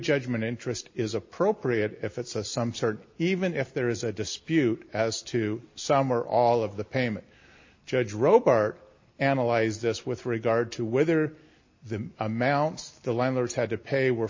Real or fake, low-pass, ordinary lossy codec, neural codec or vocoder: real; 7.2 kHz; MP3, 32 kbps; none